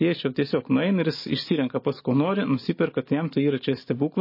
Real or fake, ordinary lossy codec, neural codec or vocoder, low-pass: real; MP3, 24 kbps; none; 5.4 kHz